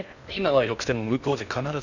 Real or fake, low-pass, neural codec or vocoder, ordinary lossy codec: fake; 7.2 kHz; codec, 16 kHz in and 24 kHz out, 0.6 kbps, FocalCodec, streaming, 2048 codes; none